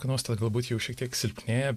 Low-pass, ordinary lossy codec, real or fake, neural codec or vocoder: 14.4 kHz; MP3, 96 kbps; real; none